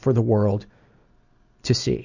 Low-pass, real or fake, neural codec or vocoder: 7.2 kHz; real; none